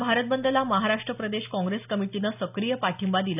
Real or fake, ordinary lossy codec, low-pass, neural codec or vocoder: real; none; 3.6 kHz; none